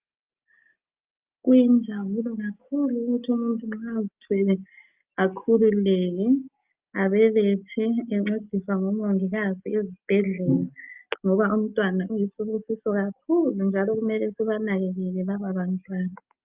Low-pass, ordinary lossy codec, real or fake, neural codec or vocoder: 3.6 kHz; Opus, 24 kbps; real; none